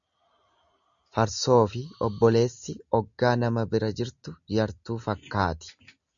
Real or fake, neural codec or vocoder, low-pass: real; none; 7.2 kHz